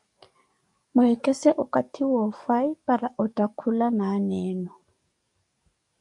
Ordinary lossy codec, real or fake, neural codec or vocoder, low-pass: MP3, 64 kbps; fake; codec, 44.1 kHz, 7.8 kbps, DAC; 10.8 kHz